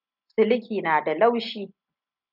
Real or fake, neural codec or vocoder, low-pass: real; none; 5.4 kHz